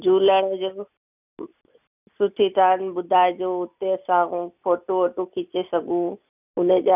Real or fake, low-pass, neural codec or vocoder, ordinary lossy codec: real; 3.6 kHz; none; none